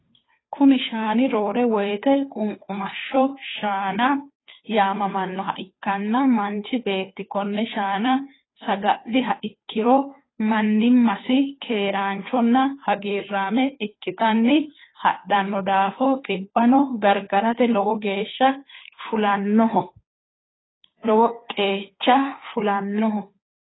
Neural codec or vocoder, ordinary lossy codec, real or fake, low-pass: codec, 16 kHz, 2 kbps, FunCodec, trained on Chinese and English, 25 frames a second; AAC, 16 kbps; fake; 7.2 kHz